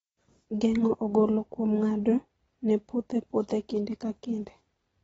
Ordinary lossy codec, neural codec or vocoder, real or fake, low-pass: AAC, 24 kbps; none; real; 19.8 kHz